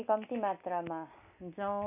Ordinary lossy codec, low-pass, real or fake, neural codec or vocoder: none; 3.6 kHz; real; none